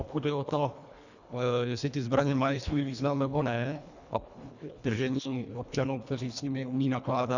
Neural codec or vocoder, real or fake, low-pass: codec, 24 kHz, 1.5 kbps, HILCodec; fake; 7.2 kHz